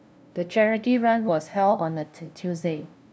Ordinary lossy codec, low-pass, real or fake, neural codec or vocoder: none; none; fake; codec, 16 kHz, 0.5 kbps, FunCodec, trained on LibriTTS, 25 frames a second